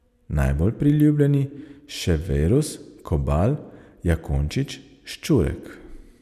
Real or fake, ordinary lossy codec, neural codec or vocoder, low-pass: fake; none; vocoder, 44.1 kHz, 128 mel bands every 256 samples, BigVGAN v2; 14.4 kHz